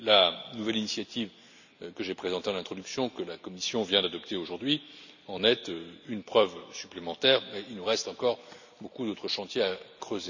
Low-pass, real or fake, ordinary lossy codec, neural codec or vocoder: 7.2 kHz; real; none; none